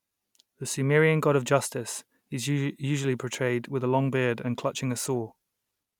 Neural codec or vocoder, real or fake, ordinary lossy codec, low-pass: none; real; none; 19.8 kHz